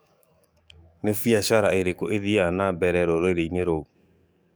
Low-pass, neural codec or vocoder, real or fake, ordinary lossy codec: none; codec, 44.1 kHz, 7.8 kbps, DAC; fake; none